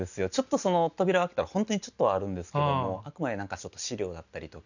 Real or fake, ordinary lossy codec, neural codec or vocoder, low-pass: real; none; none; 7.2 kHz